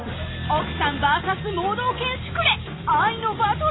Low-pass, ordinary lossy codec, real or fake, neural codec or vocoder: 7.2 kHz; AAC, 16 kbps; real; none